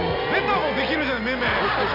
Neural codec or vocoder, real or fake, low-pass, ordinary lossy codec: none; real; 5.4 kHz; none